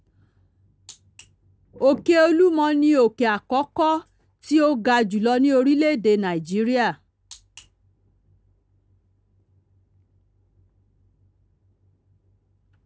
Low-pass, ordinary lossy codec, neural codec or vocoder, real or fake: none; none; none; real